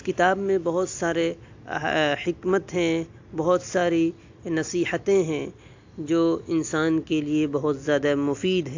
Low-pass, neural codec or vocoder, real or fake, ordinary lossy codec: 7.2 kHz; none; real; AAC, 48 kbps